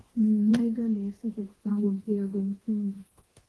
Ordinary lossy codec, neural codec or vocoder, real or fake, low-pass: Opus, 16 kbps; codec, 24 kHz, 0.9 kbps, DualCodec; fake; 10.8 kHz